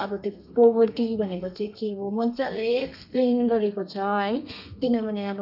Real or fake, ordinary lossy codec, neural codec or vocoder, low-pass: fake; none; codec, 32 kHz, 1.9 kbps, SNAC; 5.4 kHz